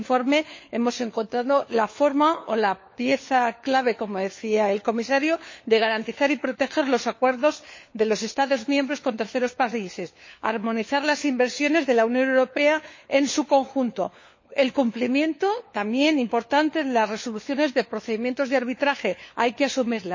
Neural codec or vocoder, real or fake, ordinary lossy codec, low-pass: codec, 16 kHz, 4 kbps, FunCodec, trained on LibriTTS, 50 frames a second; fake; MP3, 32 kbps; 7.2 kHz